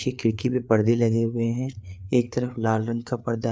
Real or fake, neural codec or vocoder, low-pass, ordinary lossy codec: fake; codec, 16 kHz, 4 kbps, FreqCodec, larger model; none; none